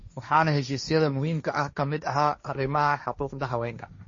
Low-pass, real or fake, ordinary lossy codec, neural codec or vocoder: 7.2 kHz; fake; MP3, 32 kbps; codec, 16 kHz, 1.1 kbps, Voila-Tokenizer